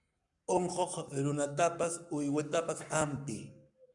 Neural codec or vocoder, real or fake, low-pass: codec, 44.1 kHz, 7.8 kbps, Pupu-Codec; fake; 10.8 kHz